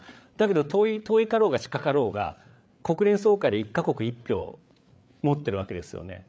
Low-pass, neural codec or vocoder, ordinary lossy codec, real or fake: none; codec, 16 kHz, 8 kbps, FreqCodec, larger model; none; fake